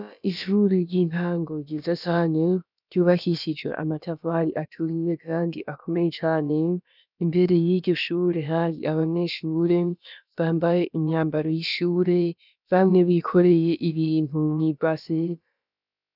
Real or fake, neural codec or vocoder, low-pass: fake; codec, 16 kHz, about 1 kbps, DyCAST, with the encoder's durations; 5.4 kHz